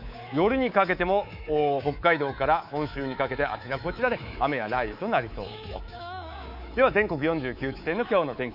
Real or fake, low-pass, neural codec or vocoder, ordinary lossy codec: fake; 5.4 kHz; codec, 24 kHz, 3.1 kbps, DualCodec; none